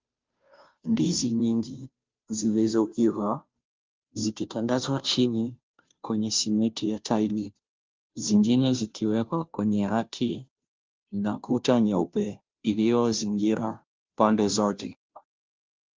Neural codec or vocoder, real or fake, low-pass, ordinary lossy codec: codec, 16 kHz, 0.5 kbps, FunCodec, trained on Chinese and English, 25 frames a second; fake; 7.2 kHz; Opus, 24 kbps